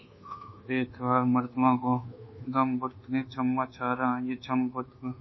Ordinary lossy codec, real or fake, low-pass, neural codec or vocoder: MP3, 24 kbps; fake; 7.2 kHz; codec, 24 kHz, 1.2 kbps, DualCodec